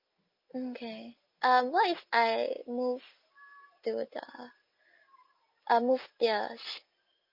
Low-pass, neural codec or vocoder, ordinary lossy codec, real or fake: 5.4 kHz; none; Opus, 24 kbps; real